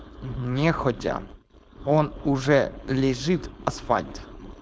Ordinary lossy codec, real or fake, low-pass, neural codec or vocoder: none; fake; none; codec, 16 kHz, 4.8 kbps, FACodec